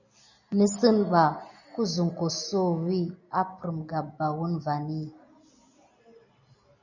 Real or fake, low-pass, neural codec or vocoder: real; 7.2 kHz; none